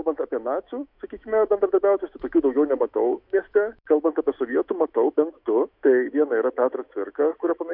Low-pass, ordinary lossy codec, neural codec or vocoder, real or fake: 5.4 kHz; MP3, 48 kbps; autoencoder, 48 kHz, 128 numbers a frame, DAC-VAE, trained on Japanese speech; fake